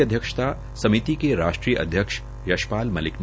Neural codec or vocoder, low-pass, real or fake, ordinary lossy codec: none; none; real; none